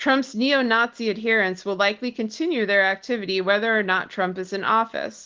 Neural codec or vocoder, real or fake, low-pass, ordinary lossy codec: none; real; 7.2 kHz; Opus, 16 kbps